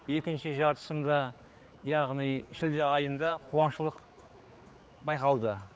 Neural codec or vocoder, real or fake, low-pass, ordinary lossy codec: codec, 16 kHz, 4 kbps, X-Codec, HuBERT features, trained on general audio; fake; none; none